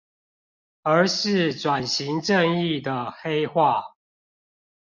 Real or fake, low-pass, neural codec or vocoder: real; 7.2 kHz; none